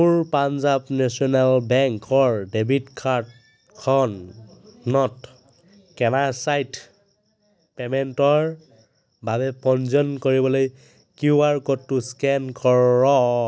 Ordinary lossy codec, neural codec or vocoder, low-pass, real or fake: none; none; none; real